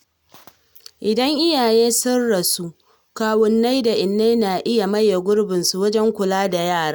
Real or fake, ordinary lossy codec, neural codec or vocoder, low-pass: real; none; none; none